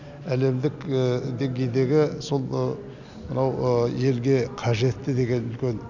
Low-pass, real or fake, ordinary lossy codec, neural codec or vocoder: 7.2 kHz; real; none; none